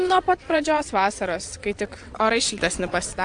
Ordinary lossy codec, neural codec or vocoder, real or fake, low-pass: AAC, 64 kbps; vocoder, 22.05 kHz, 80 mel bands, WaveNeXt; fake; 9.9 kHz